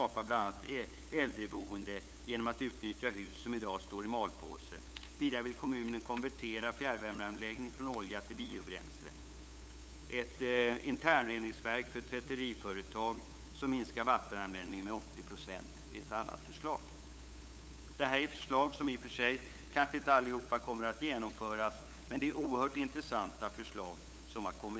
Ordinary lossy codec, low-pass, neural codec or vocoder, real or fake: none; none; codec, 16 kHz, 8 kbps, FunCodec, trained on LibriTTS, 25 frames a second; fake